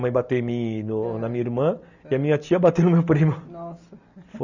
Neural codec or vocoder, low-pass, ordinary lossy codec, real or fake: none; 7.2 kHz; none; real